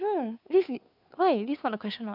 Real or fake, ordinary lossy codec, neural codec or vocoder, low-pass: fake; none; codec, 16 kHz, 2 kbps, FunCodec, trained on Chinese and English, 25 frames a second; 5.4 kHz